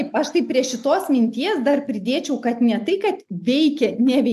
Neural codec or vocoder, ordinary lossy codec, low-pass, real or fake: none; AAC, 96 kbps; 14.4 kHz; real